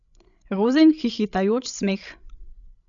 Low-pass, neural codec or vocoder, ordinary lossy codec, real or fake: 7.2 kHz; codec, 16 kHz, 16 kbps, FreqCodec, larger model; none; fake